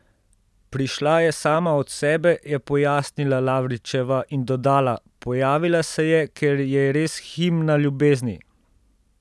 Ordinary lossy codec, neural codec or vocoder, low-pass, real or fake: none; none; none; real